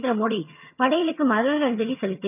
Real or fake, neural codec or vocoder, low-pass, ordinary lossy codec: fake; vocoder, 22.05 kHz, 80 mel bands, HiFi-GAN; 3.6 kHz; none